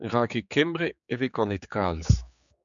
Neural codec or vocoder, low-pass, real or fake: codec, 16 kHz, 4 kbps, X-Codec, HuBERT features, trained on general audio; 7.2 kHz; fake